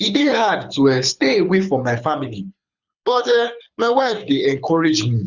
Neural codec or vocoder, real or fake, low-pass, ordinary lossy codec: codec, 24 kHz, 6 kbps, HILCodec; fake; 7.2 kHz; Opus, 64 kbps